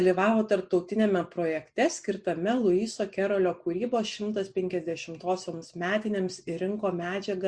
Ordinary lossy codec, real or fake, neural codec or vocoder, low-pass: Opus, 64 kbps; real; none; 9.9 kHz